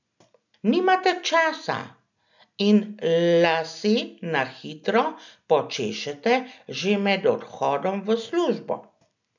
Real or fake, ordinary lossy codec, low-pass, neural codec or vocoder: real; none; 7.2 kHz; none